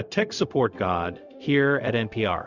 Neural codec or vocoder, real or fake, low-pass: codec, 16 kHz, 0.4 kbps, LongCat-Audio-Codec; fake; 7.2 kHz